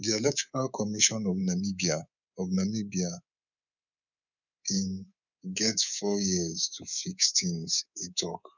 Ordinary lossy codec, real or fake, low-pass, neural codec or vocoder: none; fake; 7.2 kHz; codec, 24 kHz, 3.1 kbps, DualCodec